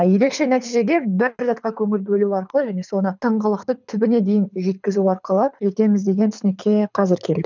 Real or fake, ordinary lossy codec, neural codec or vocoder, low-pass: fake; none; codec, 24 kHz, 6 kbps, HILCodec; 7.2 kHz